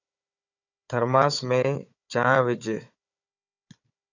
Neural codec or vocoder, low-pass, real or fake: codec, 16 kHz, 4 kbps, FunCodec, trained on Chinese and English, 50 frames a second; 7.2 kHz; fake